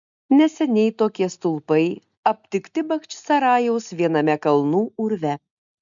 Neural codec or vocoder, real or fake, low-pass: none; real; 7.2 kHz